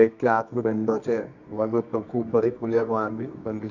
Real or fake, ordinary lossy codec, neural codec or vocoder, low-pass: fake; none; codec, 24 kHz, 0.9 kbps, WavTokenizer, medium music audio release; 7.2 kHz